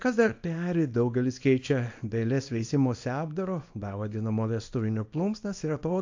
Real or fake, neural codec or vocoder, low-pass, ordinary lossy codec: fake; codec, 24 kHz, 0.9 kbps, WavTokenizer, small release; 7.2 kHz; AAC, 48 kbps